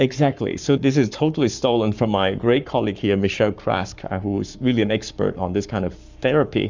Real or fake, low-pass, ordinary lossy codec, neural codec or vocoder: fake; 7.2 kHz; Opus, 64 kbps; codec, 44.1 kHz, 7.8 kbps, Pupu-Codec